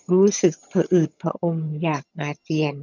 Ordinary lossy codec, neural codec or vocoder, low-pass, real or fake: none; vocoder, 22.05 kHz, 80 mel bands, HiFi-GAN; 7.2 kHz; fake